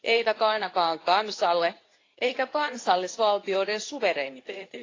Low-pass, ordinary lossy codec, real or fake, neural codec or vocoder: 7.2 kHz; AAC, 32 kbps; fake; codec, 24 kHz, 0.9 kbps, WavTokenizer, medium speech release version 2